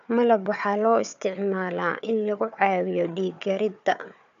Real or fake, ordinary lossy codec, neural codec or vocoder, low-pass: fake; none; codec, 16 kHz, 4 kbps, FunCodec, trained on Chinese and English, 50 frames a second; 7.2 kHz